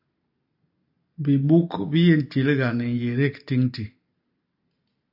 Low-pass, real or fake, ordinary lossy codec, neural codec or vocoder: 5.4 kHz; real; AAC, 48 kbps; none